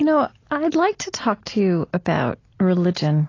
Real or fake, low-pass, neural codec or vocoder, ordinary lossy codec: real; 7.2 kHz; none; AAC, 32 kbps